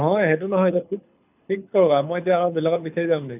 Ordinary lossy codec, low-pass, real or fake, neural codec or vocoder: none; 3.6 kHz; fake; codec, 24 kHz, 6 kbps, HILCodec